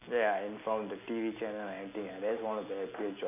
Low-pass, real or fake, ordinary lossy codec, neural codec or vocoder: 3.6 kHz; real; none; none